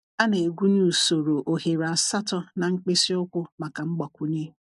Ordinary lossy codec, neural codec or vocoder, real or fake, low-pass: MP3, 96 kbps; none; real; 10.8 kHz